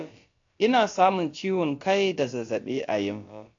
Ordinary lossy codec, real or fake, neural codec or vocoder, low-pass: AAC, 48 kbps; fake; codec, 16 kHz, about 1 kbps, DyCAST, with the encoder's durations; 7.2 kHz